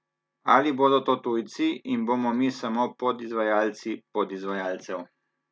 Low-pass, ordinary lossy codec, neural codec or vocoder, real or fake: none; none; none; real